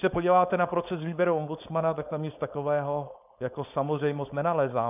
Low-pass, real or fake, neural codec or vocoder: 3.6 kHz; fake; codec, 16 kHz, 4.8 kbps, FACodec